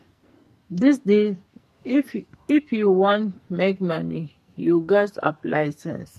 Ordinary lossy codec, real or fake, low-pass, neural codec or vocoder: MP3, 64 kbps; fake; 14.4 kHz; codec, 44.1 kHz, 2.6 kbps, SNAC